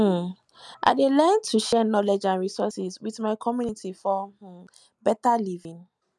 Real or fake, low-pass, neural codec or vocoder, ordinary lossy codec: real; none; none; none